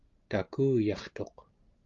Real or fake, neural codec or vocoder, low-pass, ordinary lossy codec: real; none; 7.2 kHz; Opus, 32 kbps